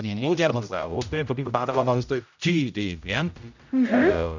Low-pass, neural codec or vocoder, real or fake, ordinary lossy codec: 7.2 kHz; codec, 16 kHz, 0.5 kbps, X-Codec, HuBERT features, trained on general audio; fake; none